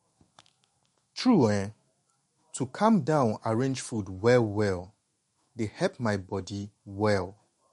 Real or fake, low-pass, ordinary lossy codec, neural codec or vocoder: fake; 19.8 kHz; MP3, 48 kbps; autoencoder, 48 kHz, 128 numbers a frame, DAC-VAE, trained on Japanese speech